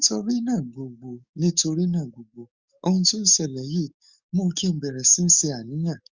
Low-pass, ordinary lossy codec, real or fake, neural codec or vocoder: 7.2 kHz; Opus, 64 kbps; fake; codec, 44.1 kHz, 7.8 kbps, DAC